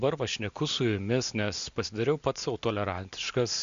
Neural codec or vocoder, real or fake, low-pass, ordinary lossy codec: none; real; 7.2 kHz; MP3, 64 kbps